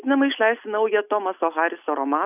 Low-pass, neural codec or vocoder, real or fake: 3.6 kHz; none; real